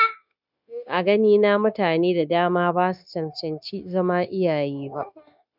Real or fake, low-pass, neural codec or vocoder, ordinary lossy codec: fake; 5.4 kHz; codec, 16 kHz, 0.9 kbps, LongCat-Audio-Codec; none